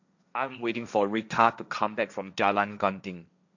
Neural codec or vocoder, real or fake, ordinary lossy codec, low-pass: codec, 16 kHz, 1.1 kbps, Voila-Tokenizer; fake; none; 7.2 kHz